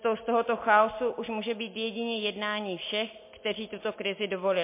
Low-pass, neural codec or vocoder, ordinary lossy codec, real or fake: 3.6 kHz; none; MP3, 24 kbps; real